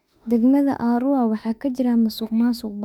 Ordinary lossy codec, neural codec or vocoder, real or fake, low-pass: none; autoencoder, 48 kHz, 32 numbers a frame, DAC-VAE, trained on Japanese speech; fake; 19.8 kHz